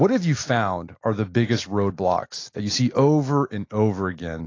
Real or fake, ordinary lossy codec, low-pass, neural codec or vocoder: real; AAC, 32 kbps; 7.2 kHz; none